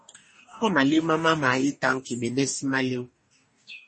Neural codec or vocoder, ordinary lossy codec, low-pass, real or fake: codec, 44.1 kHz, 2.6 kbps, DAC; MP3, 32 kbps; 10.8 kHz; fake